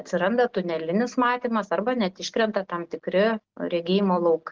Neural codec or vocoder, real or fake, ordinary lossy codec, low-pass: none; real; Opus, 16 kbps; 7.2 kHz